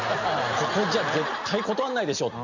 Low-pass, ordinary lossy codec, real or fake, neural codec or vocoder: 7.2 kHz; none; real; none